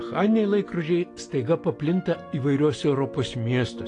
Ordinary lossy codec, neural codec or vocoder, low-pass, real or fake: AAC, 48 kbps; none; 10.8 kHz; real